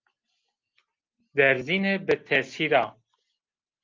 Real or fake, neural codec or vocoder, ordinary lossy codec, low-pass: real; none; Opus, 24 kbps; 7.2 kHz